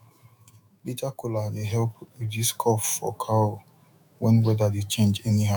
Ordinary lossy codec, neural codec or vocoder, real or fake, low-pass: none; autoencoder, 48 kHz, 128 numbers a frame, DAC-VAE, trained on Japanese speech; fake; 19.8 kHz